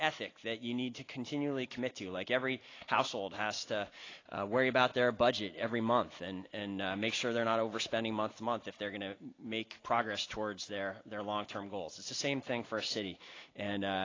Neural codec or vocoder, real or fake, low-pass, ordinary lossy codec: none; real; 7.2 kHz; AAC, 32 kbps